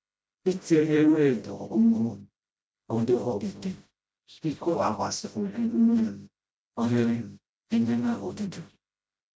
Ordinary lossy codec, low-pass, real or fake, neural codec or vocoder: none; none; fake; codec, 16 kHz, 0.5 kbps, FreqCodec, smaller model